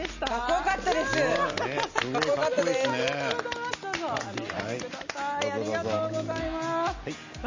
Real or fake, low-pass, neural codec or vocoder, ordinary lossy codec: real; 7.2 kHz; none; MP3, 32 kbps